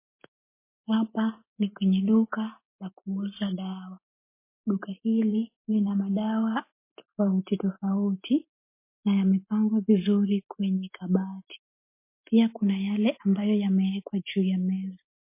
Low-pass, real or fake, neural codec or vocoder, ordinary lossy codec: 3.6 kHz; real; none; MP3, 24 kbps